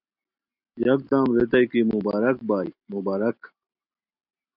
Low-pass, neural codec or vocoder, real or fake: 5.4 kHz; none; real